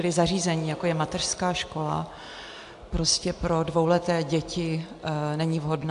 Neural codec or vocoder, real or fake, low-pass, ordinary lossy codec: none; real; 10.8 kHz; AAC, 64 kbps